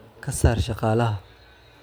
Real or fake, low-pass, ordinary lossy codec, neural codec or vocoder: real; none; none; none